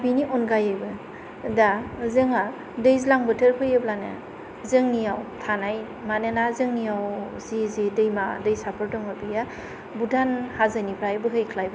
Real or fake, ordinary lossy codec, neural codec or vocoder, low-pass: real; none; none; none